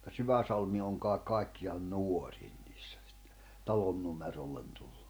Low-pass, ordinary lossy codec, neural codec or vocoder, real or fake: none; none; none; real